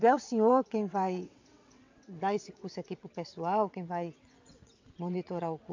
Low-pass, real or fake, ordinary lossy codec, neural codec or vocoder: 7.2 kHz; fake; AAC, 48 kbps; vocoder, 22.05 kHz, 80 mel bands, WaveNeXt